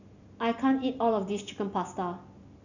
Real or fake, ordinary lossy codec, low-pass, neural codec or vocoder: real; none; 7.2 kHz; none